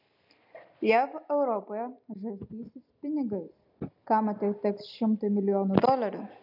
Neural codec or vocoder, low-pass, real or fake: none; 5.4 kHz; real